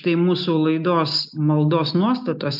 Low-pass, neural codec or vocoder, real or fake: 5.4 kHz; none; real